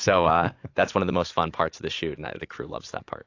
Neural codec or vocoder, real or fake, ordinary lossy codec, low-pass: vocoder, 44.1 kHz, 80 mel bands, Vocos; fake; AAC, 48 kbps; 7.2 kHz